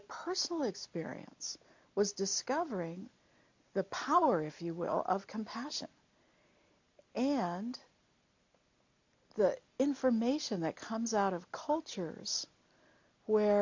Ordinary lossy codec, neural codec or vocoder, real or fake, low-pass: MP3, 48 kbps; none; real; 7.2 kHz